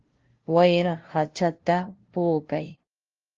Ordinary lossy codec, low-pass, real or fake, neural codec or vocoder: Opus, 16 kbps; 7.2 kHz; fake; codec, 16 kHz, 0.5 kbps, FunCodec, trained on LibriTTS, 25 frames a second